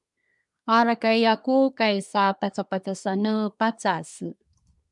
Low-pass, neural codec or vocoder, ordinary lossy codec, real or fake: 10.8 kHz; codec, 24 kHz, 1 kbps, SNAC; MP3, 96 kbps; fake